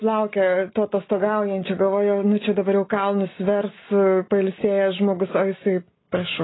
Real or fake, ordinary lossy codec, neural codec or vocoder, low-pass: real; AAC, 16 kbps; none; 7.2 kHz